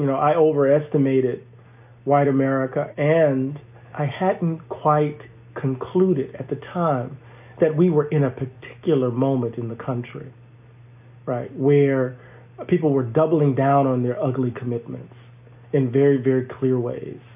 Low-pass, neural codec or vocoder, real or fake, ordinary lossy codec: 3.6 kHz; none; real; AAC, 32 kbps